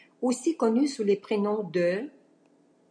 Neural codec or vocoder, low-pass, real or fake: none; 9.9 kHz; real